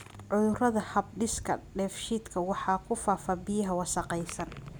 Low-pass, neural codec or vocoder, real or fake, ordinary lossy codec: none; none; real; none